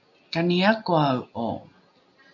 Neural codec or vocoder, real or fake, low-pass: none; real; 7.2 kHz